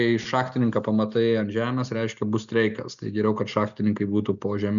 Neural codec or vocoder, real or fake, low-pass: none; real; 7.2 kHz